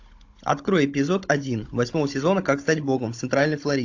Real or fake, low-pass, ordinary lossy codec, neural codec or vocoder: fake; 7.2 kHz; AAC, 48 kbps; codec, 16 kHz, 16 kbps, FunCodec, trained on Chinese and English, 50 frames a second